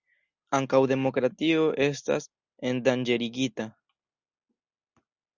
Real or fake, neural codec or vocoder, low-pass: real; none; 7.2 kHz